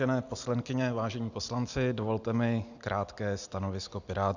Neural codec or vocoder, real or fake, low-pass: none; real; 7.2 kHz